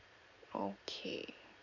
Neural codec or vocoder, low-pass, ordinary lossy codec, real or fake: vocoder, 44.1 kHz, 128 mel bands every 512 samples, BigVGAN v2; 7.2 kHz; none; fake